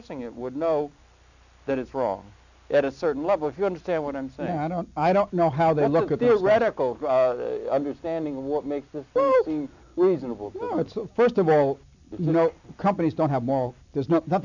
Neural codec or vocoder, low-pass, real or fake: none; 7.2 kHz; real